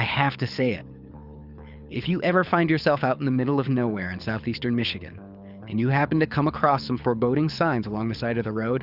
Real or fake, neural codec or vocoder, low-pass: fake; codec, 24 kHz, 6 kbps, HILCodec; 5.4 kHz